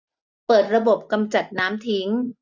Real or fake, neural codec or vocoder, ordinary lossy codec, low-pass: real; none; none; 7.2 kHz